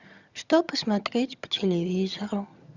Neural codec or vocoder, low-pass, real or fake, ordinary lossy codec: vocoder, 22.05 kHz, 80 mel bands, HiFi-GAN; 7.2 kHz; fake; Opus, 64 kbps